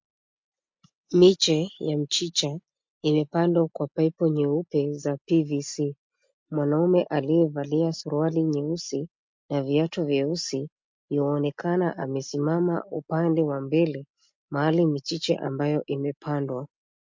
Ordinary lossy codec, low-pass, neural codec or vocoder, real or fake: MP3, 48 kbps; 7.2 kHz; none; real